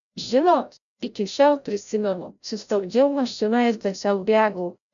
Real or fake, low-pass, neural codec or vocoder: fake; 7.2 kHz; codec, 16 kHz, 0.5 kbps, FreqCodec, larger model